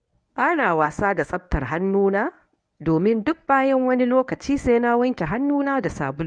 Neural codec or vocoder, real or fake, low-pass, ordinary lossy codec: codec, 24 kHz, 0.9 kbps, WavTokenizer, medium speech release version 1; fake; 9.9 kHz; none